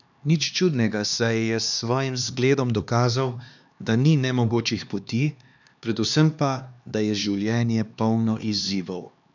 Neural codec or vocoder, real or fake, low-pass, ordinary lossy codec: codec, 16 kHz, 2 kbps, X-Codec, HuBERT features, trained on LibriSpeech; fake; 7.2 kHz; none